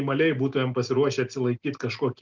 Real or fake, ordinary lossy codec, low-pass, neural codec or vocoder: real; Opus, 24 kbps; 7.2 kHz; none